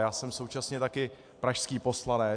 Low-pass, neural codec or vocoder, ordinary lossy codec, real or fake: 9.9 kHz; none; AAC, 64 kbps; real